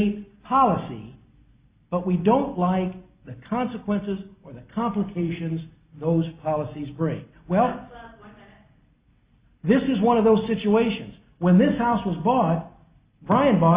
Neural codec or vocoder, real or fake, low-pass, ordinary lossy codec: none; real; 3.6 kHz; Opus, 64 kbps